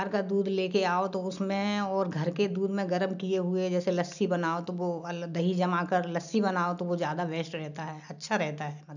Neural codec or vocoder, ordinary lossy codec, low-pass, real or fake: none; none; 7.2 kHz; real